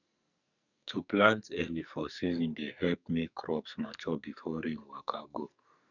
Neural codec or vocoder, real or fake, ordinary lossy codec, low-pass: codec, 44.1 kHz, 2.6 kbps, SNAC; fake; none; 7.2 kHz